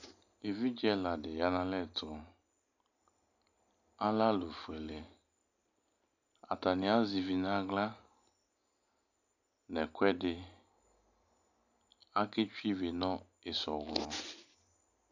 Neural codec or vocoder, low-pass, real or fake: none; 7.2 kHz; real